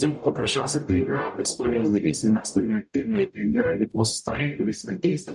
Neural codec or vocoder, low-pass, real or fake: codec, 44.1 kHz, 0.9 kbps, DAC; 10.8 kHz; fake